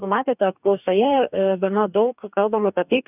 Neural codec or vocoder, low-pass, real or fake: codec, 44.1 kHz, 2.6 kbps, DAC; 3.6 kHz; fake